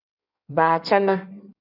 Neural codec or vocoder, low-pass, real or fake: codec, 16 kHz in and 24 kHz out, 1.1 kbps, FireRedTTS-2 codec; 5.4 kHz; fake